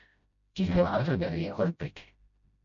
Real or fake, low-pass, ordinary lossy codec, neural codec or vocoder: fake; 7.2 kHz; MP3, 64 kbps; codec, 16 kHz, 0.5 kbps, FreqCodec, smaller model